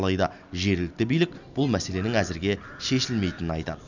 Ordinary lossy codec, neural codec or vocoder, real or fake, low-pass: none; none; real; 7.2 kHz